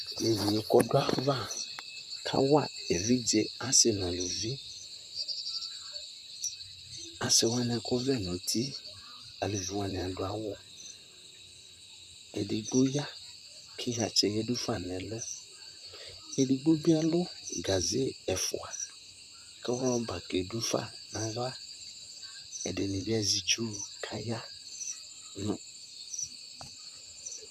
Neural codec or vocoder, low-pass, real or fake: vocoder, 44.1 kHz, 128 mel bands, Pupu-Vocoder; 14.4 kHz; fake